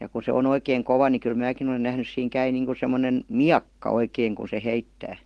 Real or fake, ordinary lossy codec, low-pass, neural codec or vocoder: real; Opus, 16 kbps; 10.8 kHz; none